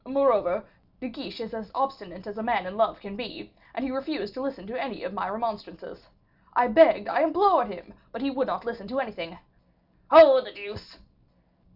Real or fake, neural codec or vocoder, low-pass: real; none; 5.4 kHz